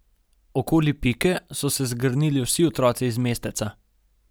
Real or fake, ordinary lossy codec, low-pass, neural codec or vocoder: real; none; none; none